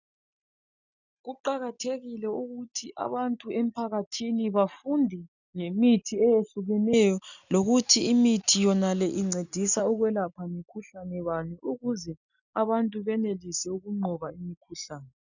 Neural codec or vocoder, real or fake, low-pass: none; real; 7.2 kHz